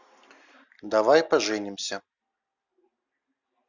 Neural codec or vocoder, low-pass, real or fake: none; 7.2 kHz; real